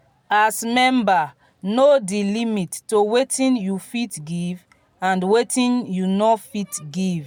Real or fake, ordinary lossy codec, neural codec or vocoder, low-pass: real; none; none; none